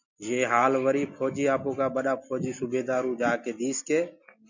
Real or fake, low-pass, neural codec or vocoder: real; 7.2 kHz; none